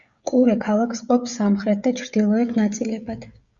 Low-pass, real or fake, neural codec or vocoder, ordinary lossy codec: 7.2 kHz; fake; codec, 16 kHz, 16 kbps, FreqCodec, smaller model; Opus, 64 kbps